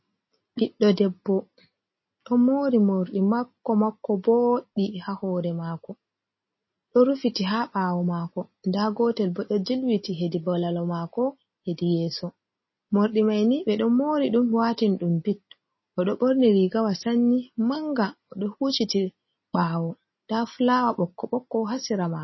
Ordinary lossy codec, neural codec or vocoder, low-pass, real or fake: MP3, 24 kbps; none; 7.2 kHz; real